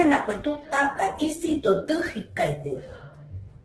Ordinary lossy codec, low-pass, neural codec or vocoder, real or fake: Opus, 16 kbps; 10.8 kHz; autoencoder, 48 kHz, 32 numbers a frame, DAC-VAE, trained on Japanese speech; fake